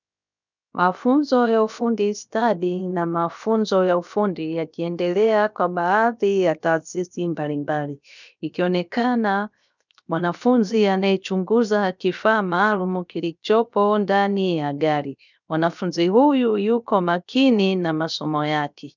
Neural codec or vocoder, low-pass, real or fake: codec, 16 kHz, 0.7 kbps, FocalCodec; 7.2 kHz; fake